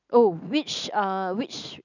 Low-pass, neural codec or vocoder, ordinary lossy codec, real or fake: 7.2 kHz; none; none; real